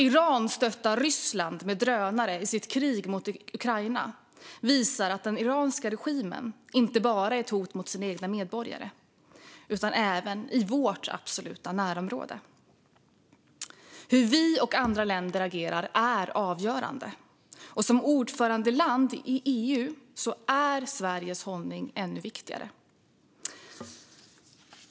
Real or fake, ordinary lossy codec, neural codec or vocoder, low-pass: real; none; none; none